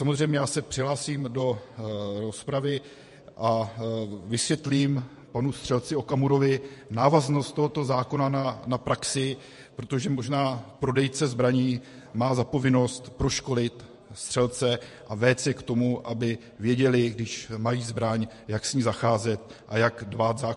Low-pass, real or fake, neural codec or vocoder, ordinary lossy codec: 14.4 kHz; fake; vocoder, 48 kHz, 128 mel bands, Vocos; MP3, 48 kbps